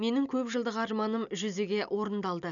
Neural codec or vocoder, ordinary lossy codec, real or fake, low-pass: none; none; real; 7.2 kHz